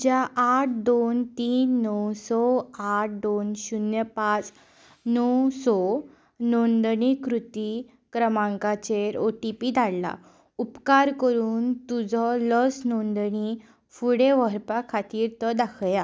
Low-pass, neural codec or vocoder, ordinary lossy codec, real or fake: none; none; none; real